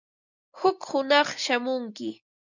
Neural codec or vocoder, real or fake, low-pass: none; real; 7.2 kHz